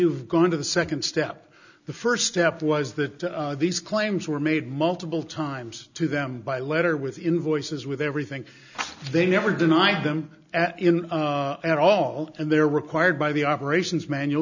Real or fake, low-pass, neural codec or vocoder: real; 7.2 kHz; none